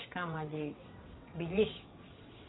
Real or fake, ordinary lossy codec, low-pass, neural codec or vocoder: fake; AAC, 16 kbps; 7.2 kHz; vocoder, 22.05 kHz, 80 mel bands, WaveNeXt